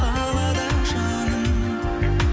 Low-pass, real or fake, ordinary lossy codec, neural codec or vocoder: none; real; none; none